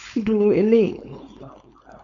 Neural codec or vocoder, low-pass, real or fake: codec, 16 kHz, 4.8 kbps, FACodec; 7.2 kHz; fake